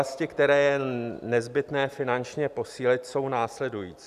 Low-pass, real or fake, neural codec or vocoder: 14.4 kHz; real; none